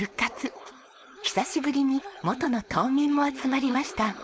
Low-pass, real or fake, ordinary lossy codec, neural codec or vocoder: none; fake; none; codec, 16 kHz, 4.8 kbps, FACodec